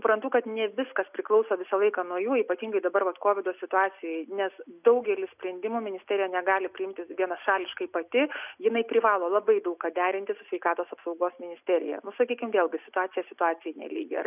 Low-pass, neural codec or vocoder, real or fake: 3.6 kHz; none; real